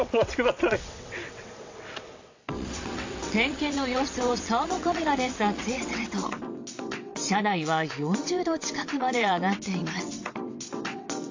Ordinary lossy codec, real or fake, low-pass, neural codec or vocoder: AAC, 48 kbps; fake; 7.2 kHz; vocoder, 22.05 kHz, 80 mel bands, WaveNeXt